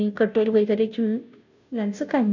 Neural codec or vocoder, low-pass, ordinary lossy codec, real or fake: codec, 16 kHz, 0.5 kbps, FunCodec, trained on Chinese and English, 25 frames a second; 7.2 kHz; none; fake